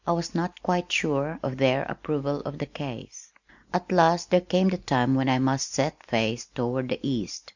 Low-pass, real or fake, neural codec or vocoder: 7.2 kHz; real; none